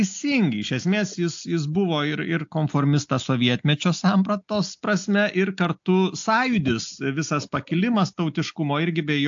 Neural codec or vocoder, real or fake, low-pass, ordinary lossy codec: none; real; 7.2 kHz; AAC, 64 kbps